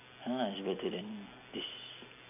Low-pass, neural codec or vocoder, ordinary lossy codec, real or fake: 3.6 kHz; none; none; real